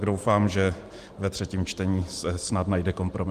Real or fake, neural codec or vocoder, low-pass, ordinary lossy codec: fake; vocoder, 44.1 kHz, 128 mel bands every 512 samples, BigVGAN v2; 14.4 kHz; Opus, 24 kbps